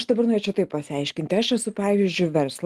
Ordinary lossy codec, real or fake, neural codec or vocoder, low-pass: Opus, 24 kbps; real; none; 14.4 kHz